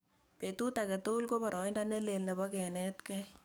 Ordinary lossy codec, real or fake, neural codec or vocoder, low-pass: none; fake; codec, 44.1 kHz, 7.8 kbps, DAC; none